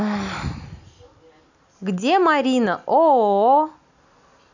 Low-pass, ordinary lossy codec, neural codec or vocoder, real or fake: 7.2 kHz; none; none; real